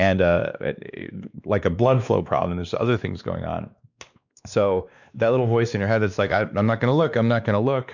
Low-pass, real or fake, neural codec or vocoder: 7.2 kHz; fake; codec, 16 kHz, 2 kbps, X-Codec, WavLM features, trained on Multilingual LibriSpeech